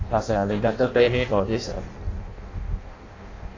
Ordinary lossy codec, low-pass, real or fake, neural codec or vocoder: AAC, 32 kbps; 7.2 kHz; fake; codec, 16 kHz in and 24 kHz out, 0.6 kbps, FireRedTTS-2 codec